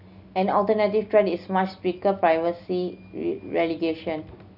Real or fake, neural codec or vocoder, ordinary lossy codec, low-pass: real; none; none; 5.4 kHz